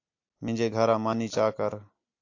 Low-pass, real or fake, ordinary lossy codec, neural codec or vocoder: 7.2 kHz; real; AAC, 48 kbps; none